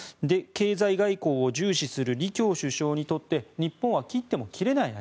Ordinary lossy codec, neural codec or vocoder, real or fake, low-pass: none; none; real; none